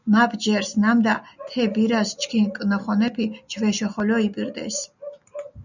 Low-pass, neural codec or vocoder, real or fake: 7.2 kHz; none; real